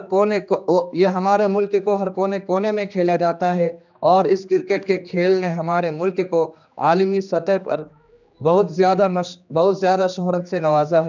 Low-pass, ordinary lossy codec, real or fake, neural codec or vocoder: 7.2 kHz; none; fake; codec, 16 kHz, 2 kbps, X-Codec, HuBERT features, trained on general audio